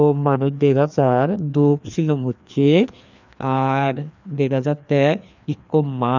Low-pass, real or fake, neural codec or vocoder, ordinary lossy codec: 7.2 kHz; fake; codec, 16 kHz, 2 kbps, FreqCodec, larger model; none